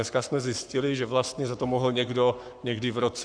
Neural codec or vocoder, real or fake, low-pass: codec, 44.1 kHz, 7.8 kbps, Pupu-Codec; fake; 9.9 kHz